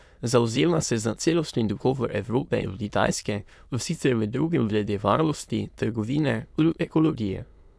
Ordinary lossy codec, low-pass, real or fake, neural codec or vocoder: none; none; fake; autoencoder, 22.05 kHz, a latent of 192 numbers a frame, VITS, trained on many speakers